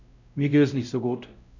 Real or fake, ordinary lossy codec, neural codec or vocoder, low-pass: fake; none; codec, 16 kHz, 0.5 kbps, X-Codec, WavLM features, trained on Multilingual LibriSpeech; 7.2 kHz